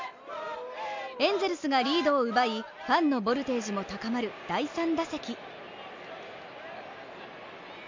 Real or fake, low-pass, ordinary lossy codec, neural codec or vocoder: real; 7.2 kHz; MP3, 64 kbps; none